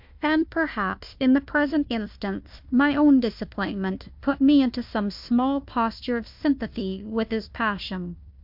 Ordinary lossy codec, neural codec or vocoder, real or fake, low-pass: MP3, 48 kbps; codec, 16 kHz, 1 kbps, FunCodec, trained on Chinese and English, 50 frames a second; fake; 5.4 kHz